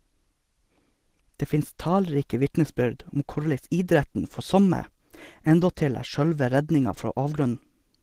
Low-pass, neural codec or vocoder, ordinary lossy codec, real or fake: 14.4 kHz; none; Opus, 16 kbps; real